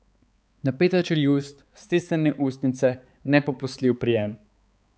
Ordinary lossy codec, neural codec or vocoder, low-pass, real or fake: none; codec, 16 kHz, 4 kbps, X-Codec, HuBERT features, trained on balanced general audio; none; fake